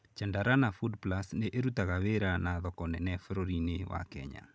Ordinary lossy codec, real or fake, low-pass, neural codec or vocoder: none; real; none; none